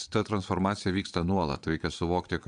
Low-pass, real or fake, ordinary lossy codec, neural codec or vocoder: 9.9 kHz; fake; AAC, 96 kbps; vocoder, 22.05 kHz, 80 mel bands, Vocos